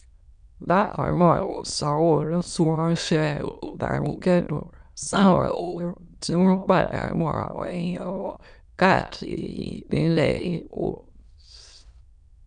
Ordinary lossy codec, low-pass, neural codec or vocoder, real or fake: none; 9.9 kHz; autoencoder, 22.05 kHz, a latent of 192 numbers a frame, VITS, trained on many speakers; fake